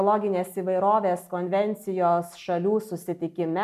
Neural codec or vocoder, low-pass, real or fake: none; 14.4 kHz; real